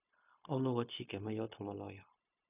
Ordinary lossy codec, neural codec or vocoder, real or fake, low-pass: none; codec, 16 kHz, 0.4 kbps, LongCat-Audio-Codec; fake; 3.6 kHz